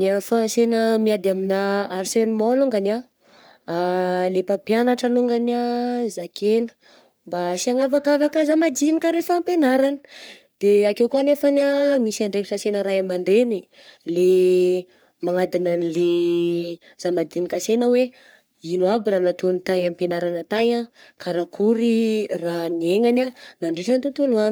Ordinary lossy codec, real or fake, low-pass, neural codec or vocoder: none; fake; none; codec, 44.1 kHz, 3.4 kbps, Pupu-Codec